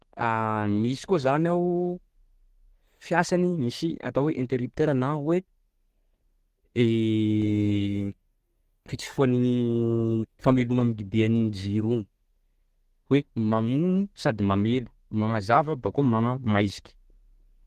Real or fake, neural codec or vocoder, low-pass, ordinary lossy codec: fake; codec, 32 kHz, 1.9 kbps, SNAC; 14.4 kHz; Opus, 16 kbps